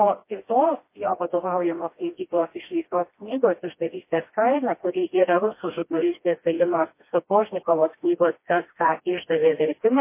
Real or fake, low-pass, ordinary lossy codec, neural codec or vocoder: fake; 3.6 kHz; MP3, 24 kbps; codec, 16 kHz, 1 kbps, FreqCodec, smaller model